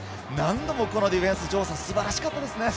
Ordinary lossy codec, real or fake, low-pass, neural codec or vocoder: none; real; none; none